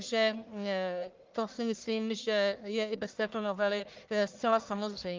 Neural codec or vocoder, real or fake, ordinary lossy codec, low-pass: codec, 44.1 kHz, 1.7 kbps, Pupu-Codec; fake; Opus, 24 kbps; 7.2 kHz